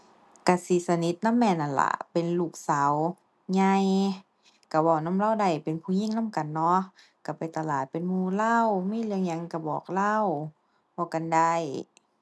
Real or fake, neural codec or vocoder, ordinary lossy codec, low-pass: real; none; none; none